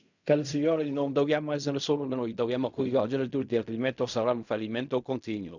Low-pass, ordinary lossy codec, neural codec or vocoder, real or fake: 7.2 kHz; none; codec, 16 kHz in and 24 kHz out, 0.4 kbps, LongCat-Audio-Codec, fine tuned four codebook decoder; fake